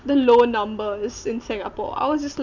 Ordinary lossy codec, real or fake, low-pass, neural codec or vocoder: none; real; 7.2 kHz; none